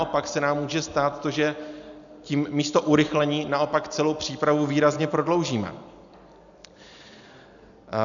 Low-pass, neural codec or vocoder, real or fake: 7.2 kHz; none; real